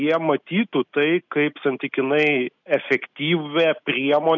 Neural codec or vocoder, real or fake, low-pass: none; real; 7.2 kHz